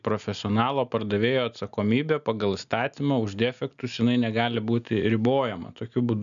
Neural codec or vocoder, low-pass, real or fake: none; 7.2 kHz; real